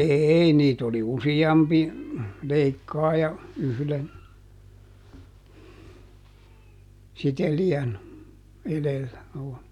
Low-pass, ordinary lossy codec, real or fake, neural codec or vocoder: 19.8 kHz; none; real; none